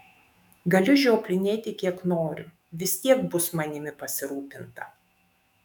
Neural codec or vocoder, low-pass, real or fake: autoencoder, 48 kHz, 128 numbers a frame, DAC-VAE, trained on Japanese speech; 19.8 kHz; fake